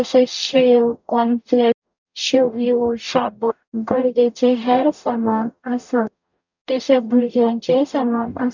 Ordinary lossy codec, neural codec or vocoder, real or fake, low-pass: none; codec, 44.1 kHz, 0.9 kbps, DAC; fake; 7.2 kHz